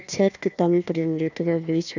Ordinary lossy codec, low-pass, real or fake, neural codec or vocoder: none; 7.2 kHz; fake; codec, 16 kHz, 1 kbps, FunCodec, trained on Chinese and English, 50 frames a second